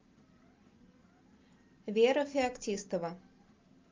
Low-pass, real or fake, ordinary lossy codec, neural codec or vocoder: 7.2 kHz; real; Opus, 24 kbps; none